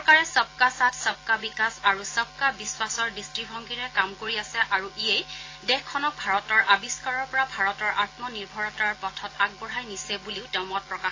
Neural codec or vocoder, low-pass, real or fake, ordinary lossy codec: none; 7.2 kHz; real; AAC, 32 kbps